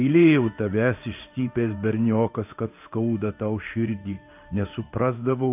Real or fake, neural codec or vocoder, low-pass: fake; codec, 16 kHz in and 24 kHz out, 1 kbps, XY-Tokenizer; 3.6 kHz